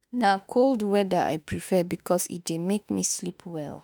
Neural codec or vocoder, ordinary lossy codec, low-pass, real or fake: autoencoder, 48 kHz, 32 numbers a frame, DAC-VAE, trained on Japanese speech; none; none; fake